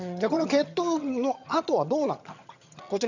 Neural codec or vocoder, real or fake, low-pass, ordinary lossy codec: vocoder, 22.05 kHz, 80 mel bands, HiFi-GAN; fake; 7.2 kHz; none